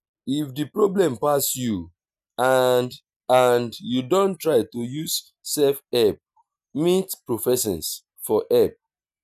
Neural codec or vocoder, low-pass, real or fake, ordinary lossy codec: none; 14.4 kHz; real; none